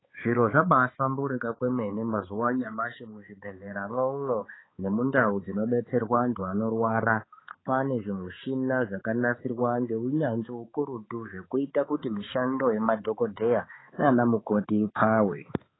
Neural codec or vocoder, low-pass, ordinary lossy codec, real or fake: codec, 16 kHz, 4 kbps, X-Codec, HuBERT features, trained on balanced general audio; 7.2 kHz; AAC, 16 kbps; fake